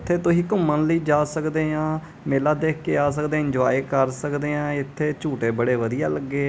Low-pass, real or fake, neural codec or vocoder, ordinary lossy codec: none; real; none; none